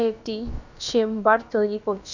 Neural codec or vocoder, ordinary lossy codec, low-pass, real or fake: codec, 16 kHz, about 1 kbps, DyCAST, with the encoder's durations; none; 7.2 kHz; fake